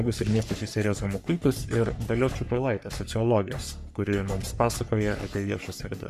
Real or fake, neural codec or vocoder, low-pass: fake; codec, 44.1 kHz, 3.4 kbps, Pupu-Codec; 14.4 kHz